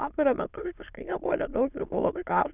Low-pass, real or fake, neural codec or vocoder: 3.6 kHz; fake; autoencoder, 22.05 kHz, a latent of 192 numbers a frame, VITS, trained on many speakers